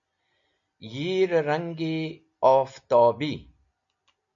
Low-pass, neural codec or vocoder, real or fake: 7.2 kHz; none; real